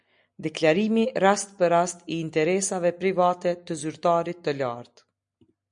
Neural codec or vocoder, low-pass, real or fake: none; 9.9 kHz; real